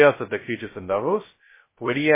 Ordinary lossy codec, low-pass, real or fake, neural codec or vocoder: MP3, 16 kbps; 3.6 kHz; fake; codec, 16 kHz, 0.2 kbps, FocalCodec